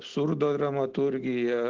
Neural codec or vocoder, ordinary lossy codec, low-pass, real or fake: none; Opus, 16 kbps; 7.2 kHz; real